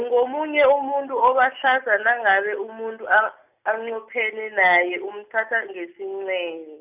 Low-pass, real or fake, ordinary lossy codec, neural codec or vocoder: 3.6 kHz; real; none; none